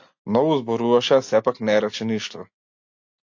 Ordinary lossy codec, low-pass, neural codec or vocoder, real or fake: AAC, 48 kbps; 7.2 kHz; none; real